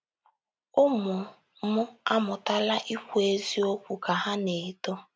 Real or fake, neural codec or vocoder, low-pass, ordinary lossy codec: real; none; none; none